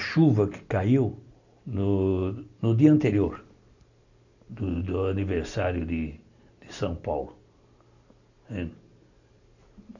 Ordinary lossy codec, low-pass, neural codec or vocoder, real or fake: none; 7.2 kHz; none; real